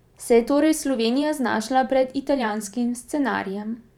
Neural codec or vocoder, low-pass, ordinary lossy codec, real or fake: vocoder, 44.1 kHz, 128 mel bands every 512 samples, BigVGAN v2; 19.8 kHz; none; fake